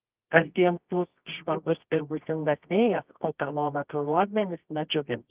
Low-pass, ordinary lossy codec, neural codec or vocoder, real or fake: 3.6 kHz; Opus, 24 kbps; codec, 24 kHz, 0.9 kbps, WavTokenizer, medium music audio release; fake